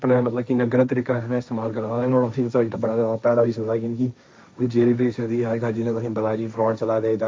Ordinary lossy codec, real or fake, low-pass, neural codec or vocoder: none; fake; none; codec, 16 kHz, 1.1 kbps, Voila-Tokenizer